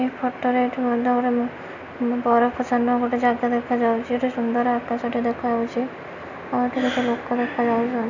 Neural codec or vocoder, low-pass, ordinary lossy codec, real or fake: none; 7.2 kHz; none; real